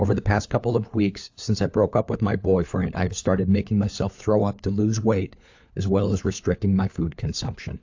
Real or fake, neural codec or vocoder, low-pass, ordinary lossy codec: fake; codec, 16 kHz, 4 kbps, FunCodec, trained on LibriTTS, 50 frames a second; 7.2 kHz; AAC, 48 kbps